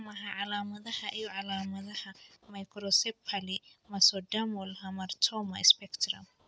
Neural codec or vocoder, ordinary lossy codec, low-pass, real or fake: none; none; none; real